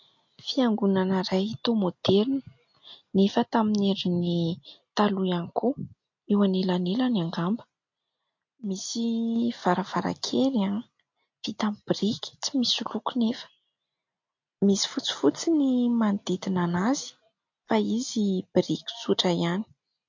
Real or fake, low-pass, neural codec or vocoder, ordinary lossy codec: real; 7.2 kHz; none; MP3, 48 kbps